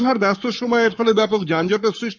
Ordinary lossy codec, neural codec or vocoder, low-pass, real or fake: none; codec, 44.1 kHz, 7.8 kbps, Pupu-Codec; 7.2 kHz; fake